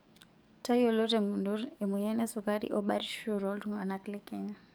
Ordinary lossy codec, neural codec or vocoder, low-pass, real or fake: none; codec, 44.1 kHz, 7.8 kbps, DAC; none; fake